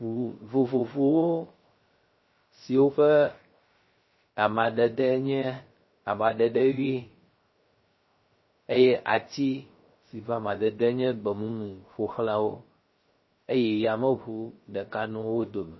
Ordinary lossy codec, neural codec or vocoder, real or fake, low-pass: MP3, 24 kbps; codec, 16 kHz, 0.3 kbps, FocalCodec; fake; 7.2 kHz